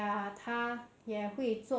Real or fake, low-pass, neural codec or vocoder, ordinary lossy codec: real; none; none; none